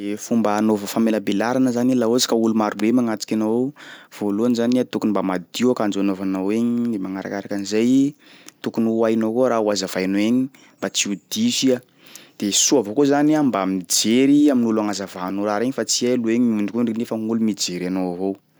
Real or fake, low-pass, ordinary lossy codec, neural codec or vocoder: real; none; none; none